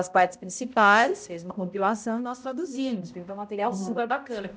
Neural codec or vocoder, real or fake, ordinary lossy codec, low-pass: codec, 16 kHz, 0.5 kbps, X-Codec, HuBERT features, trained on balanced general audio; fake; none; none